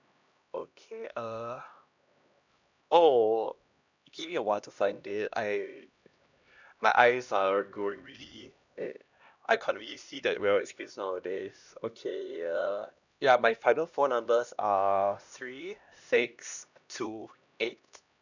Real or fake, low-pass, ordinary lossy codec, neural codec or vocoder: fake; 7.2 kHz; none; codec, 16 kHz, 1 kbps, X-Codec, HuBERT features, trained on LibriSpeech